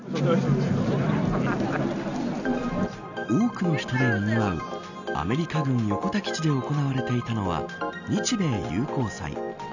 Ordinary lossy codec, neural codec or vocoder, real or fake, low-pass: none; none; real; 7.2 kHz